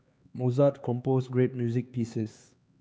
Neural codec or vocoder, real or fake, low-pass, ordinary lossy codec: codec, 16 kHz, 4 kbps, X-Codec, HuBERT features, trained on LibriSpeech; fake; none; none